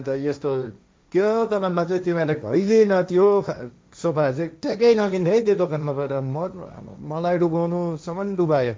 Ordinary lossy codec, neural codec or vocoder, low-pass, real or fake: none; codec, 16 kHz, 1.1 kbps, Voila-Tokenizer; none; fake